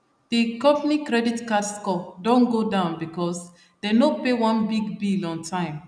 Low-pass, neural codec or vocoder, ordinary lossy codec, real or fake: 9.9 kHz; none; none; real